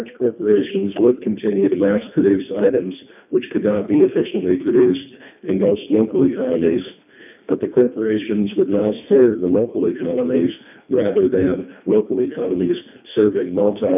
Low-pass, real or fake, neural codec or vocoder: 3.6 kHz; fake; codec, 24 kHz, 1.5 kbps, HILCodec